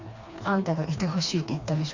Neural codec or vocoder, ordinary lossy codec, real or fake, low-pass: codec, 16 kHz, 2 kbps, FreqCodec, smaller model; Opus, 64 kbps; fake; 7.2 kHz